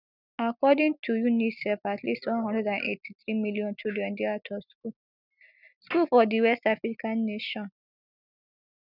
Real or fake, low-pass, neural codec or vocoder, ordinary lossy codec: real; 5.4 kHz; none; none